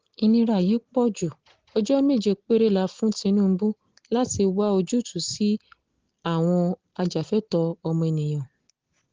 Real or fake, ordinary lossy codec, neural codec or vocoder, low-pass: real; Opus, 16 kbps; none; 7.2 kHz